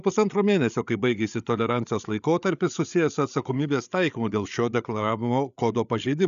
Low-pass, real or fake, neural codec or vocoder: 7.2 kHz; fake; codec, 16 kHz, 8 kbps, FreqCodec, larger model